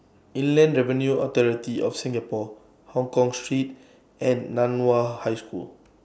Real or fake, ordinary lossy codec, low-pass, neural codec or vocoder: real; none; none; none